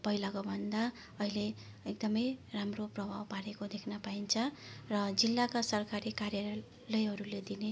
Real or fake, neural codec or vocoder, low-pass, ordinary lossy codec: real; none; none; none